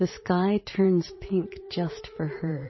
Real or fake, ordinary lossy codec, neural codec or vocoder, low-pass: fake; MP3, 24 kbps; vocoder, 44.1 kHz, 80 mel bands, Vocos; 7.2 kHz